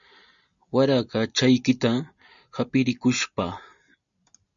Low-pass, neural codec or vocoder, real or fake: 7.2 kHz; none; real